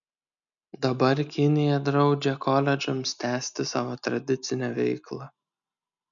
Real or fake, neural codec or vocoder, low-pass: real; none; 7.2 kHz